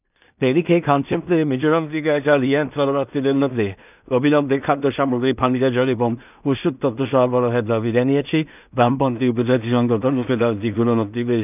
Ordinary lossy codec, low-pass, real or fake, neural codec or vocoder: none; 3.6 kHz; fake; codec, 16 kHz in and 24 kHz out, 0.4 kbps, LongCat-Audio-Codec, two codebook decoder